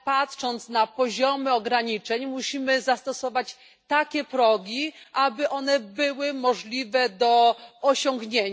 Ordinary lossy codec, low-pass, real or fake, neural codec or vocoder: none; none; real; none